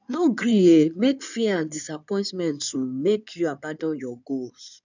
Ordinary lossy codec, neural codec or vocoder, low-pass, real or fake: none; codec, 16 kHz in and 24 kHz out, 2.2 kbps, FireRedTTS-2 codec; 7.2 kHz; fake